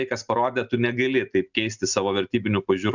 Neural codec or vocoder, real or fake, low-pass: none; real; 7.2 kHz